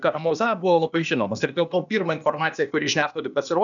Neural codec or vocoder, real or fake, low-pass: codec, 16 kHz, 0.8 kbps, ZipCodec; fake; 7.2 kHz